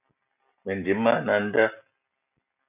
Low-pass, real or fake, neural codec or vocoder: 3.6 kHz; real; none